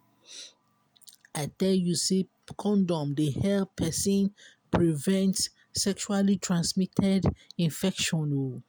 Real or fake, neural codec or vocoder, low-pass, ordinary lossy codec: real; none; none; none